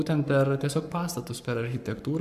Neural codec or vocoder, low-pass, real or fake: codec, 44.1 kHz, 7.8 kbps, Pupu-Codec; 14.4 kHz; fake